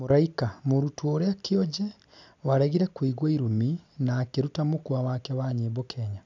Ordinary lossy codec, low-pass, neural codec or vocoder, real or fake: none; 7.2 kHz; none; real